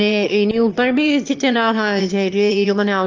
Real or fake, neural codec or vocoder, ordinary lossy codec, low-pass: fake; autoencoder, 22.05 kHz, a latent of 192 numbers a frame, VITS, trained on one speaker; Opus, 24 kbps; 7.2 kHz